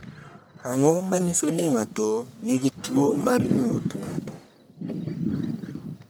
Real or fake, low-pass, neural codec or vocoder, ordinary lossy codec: fake; none; codec, 44.1 kHz, 1.7 kbps, Pupu-Codec; none